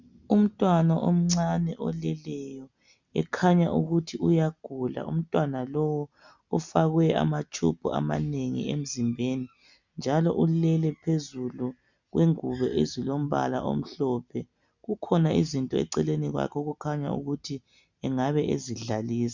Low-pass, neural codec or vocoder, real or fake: 7.2 kHz; none; real